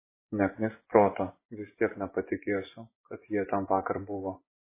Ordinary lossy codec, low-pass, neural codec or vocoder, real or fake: MP3, 16 kbps; 3.6 kHz; vocoder, 24 kHz, 100 mel bands, Vocos; fake